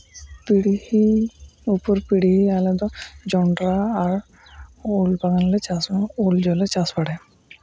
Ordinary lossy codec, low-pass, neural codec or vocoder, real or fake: none; none; none; real